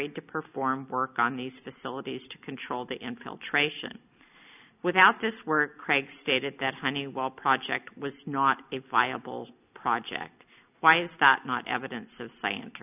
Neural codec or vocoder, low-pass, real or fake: none; 3.6 kHz; real